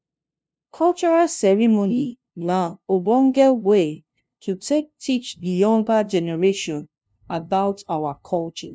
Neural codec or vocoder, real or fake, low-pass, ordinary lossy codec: codec, 16 kHz, 0.5 kbps, FunCodec, trained on LibriTTS, 25 frames a second; fake; none; none